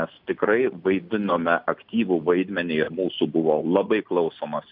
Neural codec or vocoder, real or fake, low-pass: none; real; 5.4 kHz